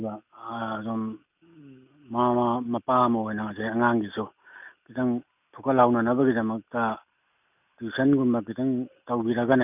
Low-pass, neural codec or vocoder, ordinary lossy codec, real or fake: 3.6 kHz; none; none; real